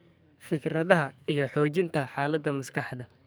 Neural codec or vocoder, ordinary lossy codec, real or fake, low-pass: codec, 44.1 kHz, 2.6 kbps, SNAC; none; fake; none